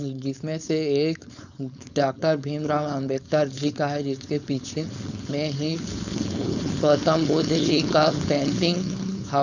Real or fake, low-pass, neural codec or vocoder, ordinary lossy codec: fake; 7.2 kHz; codec, 16 kHz, 4.8 kbps, FACodec; none